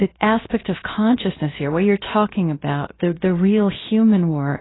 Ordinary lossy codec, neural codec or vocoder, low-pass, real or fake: AAC, 16 kbps; codec, 16 kHz, 0.7 kbps, FocalCodec; 7.2 kHz; fake